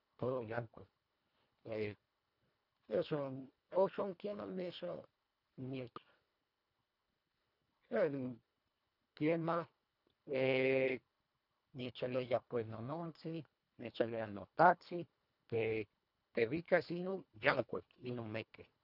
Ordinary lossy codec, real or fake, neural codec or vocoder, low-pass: none; fake; codec, 24 kHz, 1.5 kbps, HILCodec; 5.4 kHz